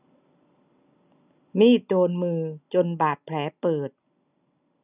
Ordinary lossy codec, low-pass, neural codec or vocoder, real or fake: none; 3.6 kHz; none; real